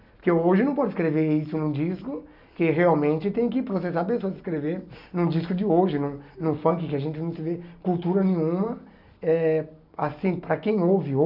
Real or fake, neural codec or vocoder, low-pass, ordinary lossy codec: real; none; 5.4 kHz; none